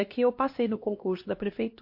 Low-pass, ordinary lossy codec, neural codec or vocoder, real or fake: 5.4 kHz; MP3, 32 kbps; codec, 16 kHz, 1 kbps, X-Codec, HuBERT features, trained on LibriSpeech; fake